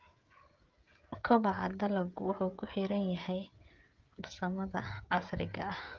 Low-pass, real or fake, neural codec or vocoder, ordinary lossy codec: 7.2 kHz; fake; codec, 16 kHz, 16 kbps, FreqCodec, smaller model; Opus, 32 kbps